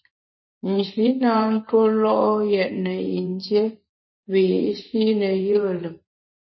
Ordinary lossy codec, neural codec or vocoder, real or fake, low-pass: MP3, 24 kbps; vocoder, 44.1 kHz, 128 mel bands every 512 samples, BigVGAN v2; fake; 7.2 kHz